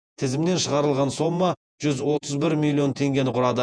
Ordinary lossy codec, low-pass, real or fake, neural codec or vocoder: MP3, 96 kbps; 9.9 kHz; fake; vocoder, 48 kHz, 128 mel bands, Vocos